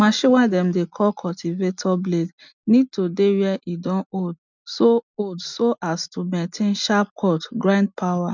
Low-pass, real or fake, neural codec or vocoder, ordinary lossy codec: 7.2 kHz; real; none; none